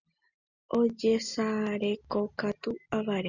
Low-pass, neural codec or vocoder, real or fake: 7.2 kHz; none; real